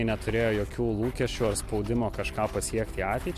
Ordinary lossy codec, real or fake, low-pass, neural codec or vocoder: MP3, 64 kbps; real; 14.4 kHz; none